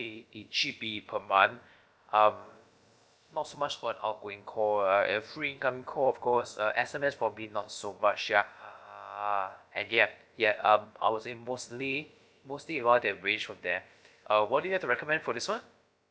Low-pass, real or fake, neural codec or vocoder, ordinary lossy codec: none; fake; codec, 16 kHz, about 1 kbps, DyCAST, with the encoder's durations; none